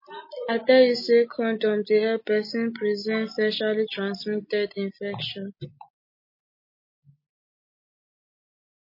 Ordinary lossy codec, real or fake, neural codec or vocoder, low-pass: MP3, 24 kbps; real; none; 5.4 kHz